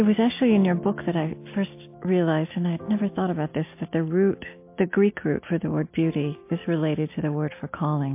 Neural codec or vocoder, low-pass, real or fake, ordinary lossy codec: none; 3.6 kHz; real; MP3, 24 kbps